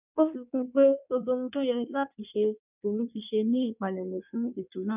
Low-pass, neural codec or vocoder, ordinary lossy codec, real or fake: 3.6 kHz; codec, 16 kHz in and 24 kHz out, 1.1 kbps, FireRedTTS-2 codec; none; fake